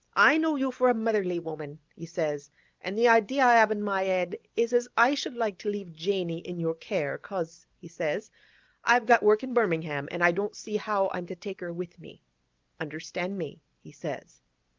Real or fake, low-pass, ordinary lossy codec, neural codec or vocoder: real; 7.2 kHz; Opus, 32 kbps; none